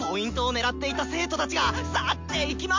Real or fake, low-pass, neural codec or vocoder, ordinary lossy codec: real; 7.2 kHz; none; MP3, 48 kbps